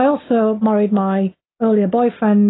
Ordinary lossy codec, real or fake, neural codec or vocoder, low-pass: AAC, 16 kbps; real; none; 7.2 kHz